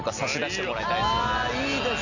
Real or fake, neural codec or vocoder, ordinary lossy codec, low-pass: real; none; none; 7.2 kHz